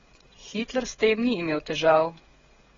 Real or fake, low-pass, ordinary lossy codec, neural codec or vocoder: fake; 7.2 kHz; AAC, 24 kbps; codec, 16 kHz, 16 kbps, FreqCodec, smaller model